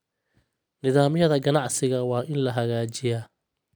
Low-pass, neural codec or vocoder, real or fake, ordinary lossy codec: none; none; real; none